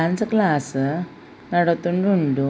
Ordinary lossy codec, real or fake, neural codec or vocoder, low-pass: none; real; none; none